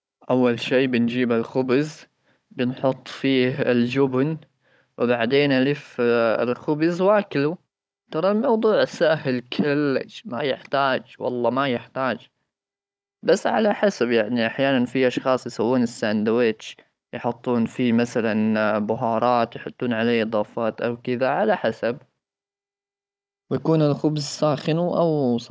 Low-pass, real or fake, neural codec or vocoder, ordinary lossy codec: none; fake; codec, 16 kHz, 16 kbps, FunCodec, trained on Chinese and English, 50 frames a second; none